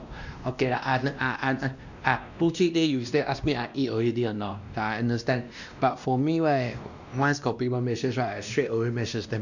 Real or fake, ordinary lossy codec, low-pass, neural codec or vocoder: fake; none; 7.2 kHz; codec, 16 kHz, 1 kbps, X-Codec, WavLM features, trained on Multilingual LibriSpeech